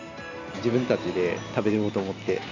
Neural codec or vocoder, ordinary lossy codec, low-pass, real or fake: none; none; 7.2 kHz; real